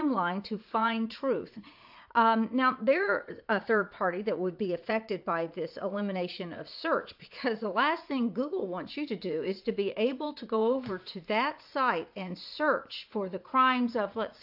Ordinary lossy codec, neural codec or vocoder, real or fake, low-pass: AAC, 48 kbps; vocoder, 44.1 kHz, 80 mel bands, Vocos; fake; 5.4 kHz